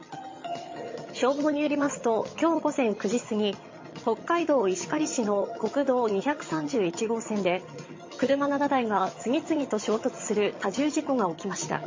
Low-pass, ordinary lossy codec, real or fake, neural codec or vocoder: 7.2 kHz; MP3, 32 kbps; fake; vocoder, 22.05 kHz, 80 mel bands, HiFi-GAN